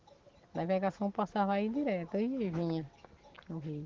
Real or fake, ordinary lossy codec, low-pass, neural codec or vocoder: real; Opus, 16 kbps; 7.2 kHz; none